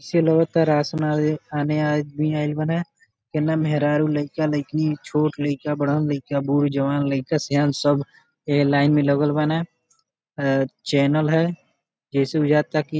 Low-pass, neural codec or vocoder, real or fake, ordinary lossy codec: none; none; real; none